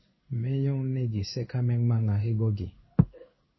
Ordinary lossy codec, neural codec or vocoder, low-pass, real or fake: MP3, 24 kbps; codec, 16 kHz in and 24 kHz out, 1 kbps, XY-Tokenizer; 7.2 kHz; fake